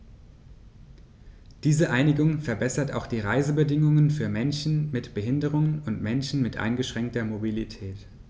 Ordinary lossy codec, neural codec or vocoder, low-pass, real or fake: none; none; none; real